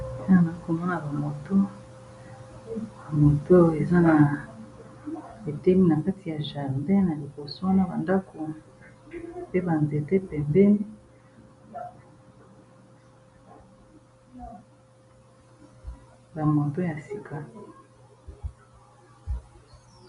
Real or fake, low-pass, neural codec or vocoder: real; 10.8 kHz; none